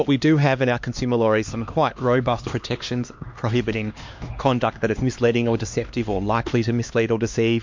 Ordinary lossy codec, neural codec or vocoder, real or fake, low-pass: MP3, 48 kbps; codec, 16 kHz, 2 kbps, X-Codec, HuBERT features, trained on LibriSpeech; fake; 7.2 kHz